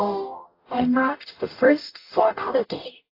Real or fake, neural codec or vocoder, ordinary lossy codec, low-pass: fake; codec, 44.1 kHz, 0.9 kbps, DAC; AAC, 32 kbps; 5.4 kHz